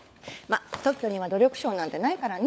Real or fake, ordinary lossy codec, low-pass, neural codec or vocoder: fake; none; none; codec, 16 kHz, 16 kbps, FunCodec, trained on LibriTTS, 50 frames a second